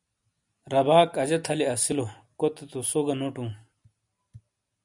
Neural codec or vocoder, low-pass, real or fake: none; 10.8 kHz; real